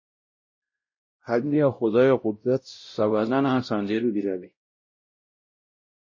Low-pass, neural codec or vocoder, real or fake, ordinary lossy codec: 7.2 kHz; codec, 16 kHz, 0.5 kbps, X-Codec, WavLM features, trained on Multilingual LibriSpeech; fake; MP3, 32 kbps